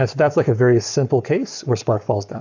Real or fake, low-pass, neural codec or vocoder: fake; 7.2 kHz; codec, 44.1 kHz, 7.8 kbps, Pupu-Codec